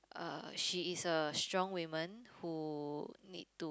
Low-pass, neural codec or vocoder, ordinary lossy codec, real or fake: none; none; none; real